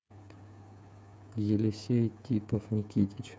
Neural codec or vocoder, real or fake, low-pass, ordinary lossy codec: codec, 16 kHz, 8 kbps, FreqCodec, smaller model; fake; none; none